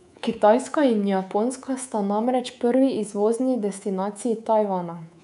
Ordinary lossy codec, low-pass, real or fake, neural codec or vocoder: none; 10.8 kHz; fake; codec, 24 kHz, 3.1 kbps, DualCodec